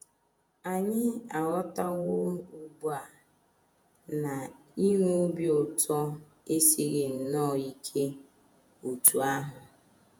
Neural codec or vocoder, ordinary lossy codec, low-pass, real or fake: vocoder, 48 kHz, 128 mel bands, Vocos; none; none; fake